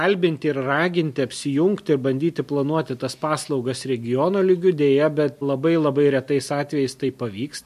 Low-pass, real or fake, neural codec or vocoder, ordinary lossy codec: 14.4 kHz; real; none; MP3, 64 kbps